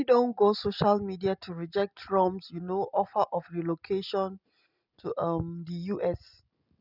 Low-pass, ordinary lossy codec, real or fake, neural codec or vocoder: 5.4 kHz; none; real; none